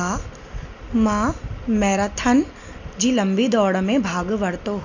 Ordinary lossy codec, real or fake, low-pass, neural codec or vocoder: none; real; 7.2 kHz; none